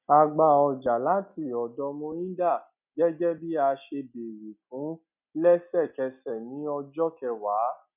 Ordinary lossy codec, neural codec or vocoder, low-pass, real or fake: none; none; 3.6 kHz; real